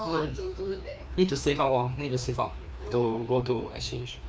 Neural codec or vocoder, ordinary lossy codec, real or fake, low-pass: codec, 16 kHz, 2 kbps, FreqCodec, larger model; none; fake; none